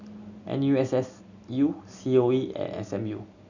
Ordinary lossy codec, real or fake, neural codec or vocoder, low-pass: none; real; none; 7.2 kHz